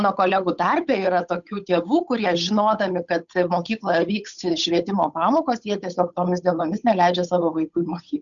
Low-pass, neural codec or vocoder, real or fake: 7.2 kHz; codec, 16 kHz, 8 kbps, FunCodec, trained on Chinese and English, 25 frames a second; fake